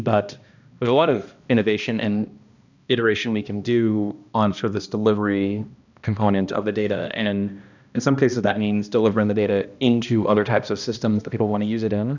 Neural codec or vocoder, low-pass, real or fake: codec, 16 kHz, 1 kbps, X-Codec, HuBERT features, trained on balanced general audio; 7.2 kHz; fake